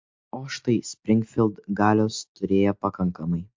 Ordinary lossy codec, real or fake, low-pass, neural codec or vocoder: MP3, 48 kbps; real; 7.2 kHz; none